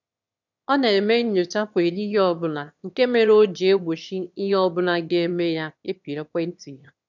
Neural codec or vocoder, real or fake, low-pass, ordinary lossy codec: autoencoder, 22.05 kHz, a latent of 192 numbers a frame, VITS, trained on one speaker; fake; 7.2 kHz; none